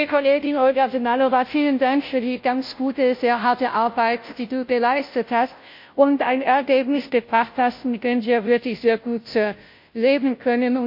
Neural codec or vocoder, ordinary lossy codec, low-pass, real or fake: codec, 16 kHz, 0.5 kbps, FunCodec, trained on Chinese and English, 25 frames a second; MP3, 32 kbps; 5.4 kHz; fake